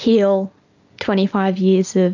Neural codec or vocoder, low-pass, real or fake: none; 7.2 kHz; real